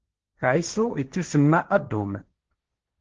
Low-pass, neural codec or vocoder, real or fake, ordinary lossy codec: 7.2 kHz; codec, 16 kHz, 1.1 kbps, Voila-Tokenizer; fake; Opus, 16 kbps